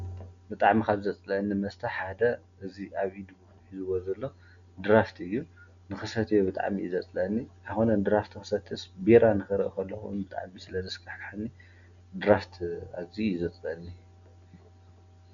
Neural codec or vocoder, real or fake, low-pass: none; real; 7.2 kHz